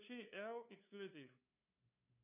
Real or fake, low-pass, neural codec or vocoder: fake; 3.6 kHz; codec, 16 kHz, 2 kbps, FunCodec, trained on LibriTTS, 25 frames a second